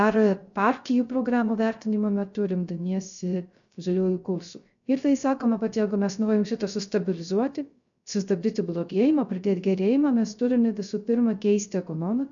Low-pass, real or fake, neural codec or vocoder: 7.2 kHz; fake; codec, 16 kHz, 0.3 kbps, FocalCodec